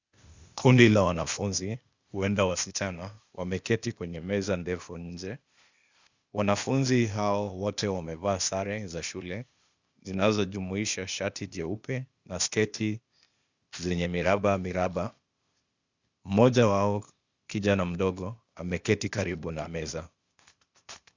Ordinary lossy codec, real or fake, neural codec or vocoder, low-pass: Opus, 64 kbps; fake; codec, 16 kHz, 0.8 kbps, ZipCodec; 7.2 kHz